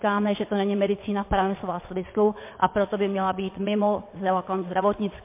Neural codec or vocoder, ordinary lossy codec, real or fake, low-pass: vocoder, 22.05 kHz, 80 mel bands, WaveNeXt; MP3, 24 kbps; fake; 3.6 kHz